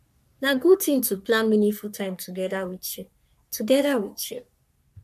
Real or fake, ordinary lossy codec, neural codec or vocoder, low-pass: fake; none; codec, 44.1 kHz, 3.4 kbps, Pupu-Codec; 14.4 kHz